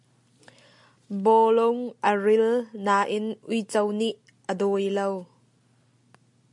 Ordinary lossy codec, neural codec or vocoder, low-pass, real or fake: MP3, 64 kbps; none; 10.8 kHz; real